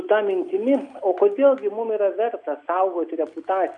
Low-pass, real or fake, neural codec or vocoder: 10.8 kHz; real; none